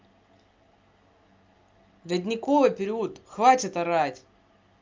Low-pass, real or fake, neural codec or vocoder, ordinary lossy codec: 7.2 kHz; real; none; Opus, 24 kbps